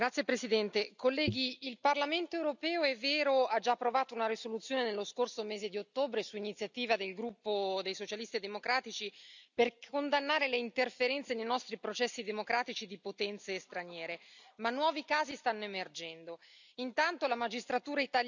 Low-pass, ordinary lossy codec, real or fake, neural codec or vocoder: 7.2 kHz; none; real; none